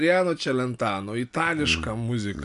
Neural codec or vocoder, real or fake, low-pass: none; real; 10.8 kHz